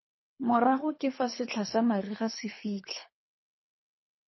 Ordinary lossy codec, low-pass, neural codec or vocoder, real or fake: MP3, 24 kbps; 7.2 kHz; codec, 24 kHz, 3 kbps, HILCodec; fake